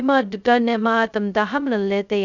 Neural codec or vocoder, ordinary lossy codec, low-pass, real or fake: codec, 16 kHz, 0.2 kbps, FocalCodec; none; 7.2 kHz; fake